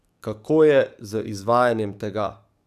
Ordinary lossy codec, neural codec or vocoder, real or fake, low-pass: none; codec, 44.1 kHz, 7.8 kbps, DAC; fake; 14.4 kHz